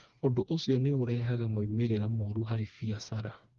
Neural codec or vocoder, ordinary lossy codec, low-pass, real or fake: codec, 16 kHz, 2 kbps, FreqCodec, smaller model; Opus, 32 kbps; 7.2 kHz; fake